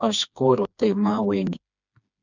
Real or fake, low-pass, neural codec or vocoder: fake; 7.2 kHz; codec, 16 kHz, 2 kbps, FreqCodec, smaller model